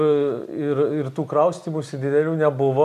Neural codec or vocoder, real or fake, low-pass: none; real; 14.4 kHz